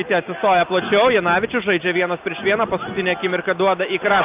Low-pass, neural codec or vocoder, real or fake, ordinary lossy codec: 3.6 kHz; none; real; Opus, 24 kbps